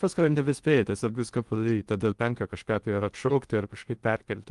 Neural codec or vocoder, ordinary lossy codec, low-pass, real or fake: codec, 16 kHz in and 24 kHz out, 0.6 kbps, FocalCodec, streaming, 2048 codes; Opus, 32 kbps; 10.8 kHz; fake